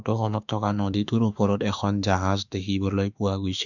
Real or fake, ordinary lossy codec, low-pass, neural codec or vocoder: fake; none; 7.2 kHz; autoencoder, 48 kHz, 32 numbers a frame, DAC-VAE, trained on Japanese speech